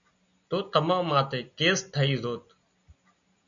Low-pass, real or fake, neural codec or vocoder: 7.2 kHz; real; none